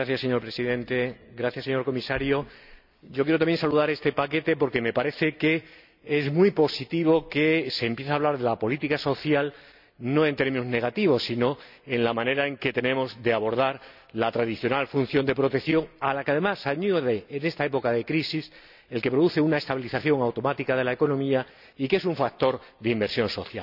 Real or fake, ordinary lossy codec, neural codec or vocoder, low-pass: real; none; none; 5.4 kHz